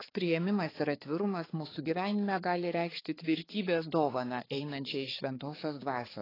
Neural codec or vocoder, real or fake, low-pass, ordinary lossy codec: codec, 16 kHz, 4 kbps, X-Codec, HuBERT features, trained on balanced general audio; fake; 5.4 kHz; AAC, 24 kbps